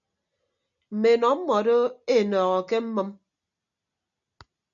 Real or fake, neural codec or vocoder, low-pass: real; none; 7.2 kHz